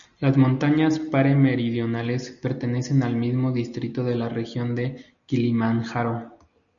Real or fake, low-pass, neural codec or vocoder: real; 7.2 kHz; none